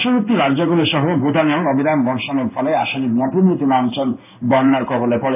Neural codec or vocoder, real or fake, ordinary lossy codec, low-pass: codec, 16 kHz in and 24 kHz out, 1 kbps, XY-Tokenizer; fake; AAC, 32 kbps; 3.6 kHz